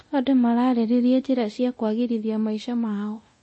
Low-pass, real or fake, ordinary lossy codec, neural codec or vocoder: 9.9 kHz; fake; MP3, 32 kbps; codec, 24 kHz, 0.9 kbps, DualCodec